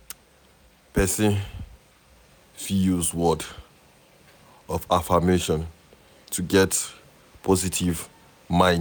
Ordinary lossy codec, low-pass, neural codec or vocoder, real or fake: none; none; none; real